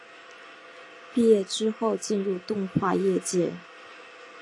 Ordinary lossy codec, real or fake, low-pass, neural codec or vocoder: AAC, 48 kbps; real; 10.8 kHz; none